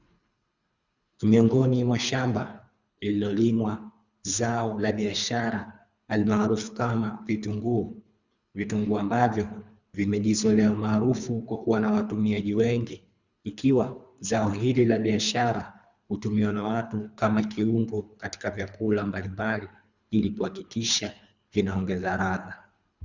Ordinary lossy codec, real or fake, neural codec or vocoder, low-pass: Opus, 64 kbps; fake; codec, 24 kHz, 3 kbps, HILCodec; 7.2 kHz